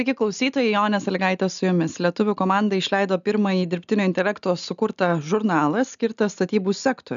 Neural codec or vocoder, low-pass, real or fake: none; 7.2 kHz; real